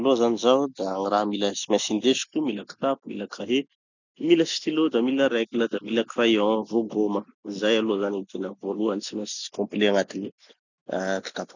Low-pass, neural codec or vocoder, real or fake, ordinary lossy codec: 7.2 kHz; none; real; none